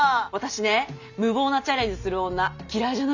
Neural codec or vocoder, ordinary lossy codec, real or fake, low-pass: none; none; real; 7.2 kHz